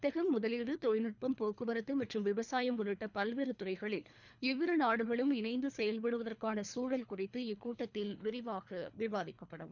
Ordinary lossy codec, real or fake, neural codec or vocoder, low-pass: none; fake; codec, 24 kHz, 3 kbps, HILCodec; 7.2 kHz